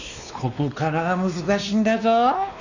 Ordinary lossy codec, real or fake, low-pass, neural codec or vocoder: none; fake; 7.2 kHz; codec, 16 kHz, 2 kbps, X-Codec, WavLM features, trained on Multilingual LibriSpeech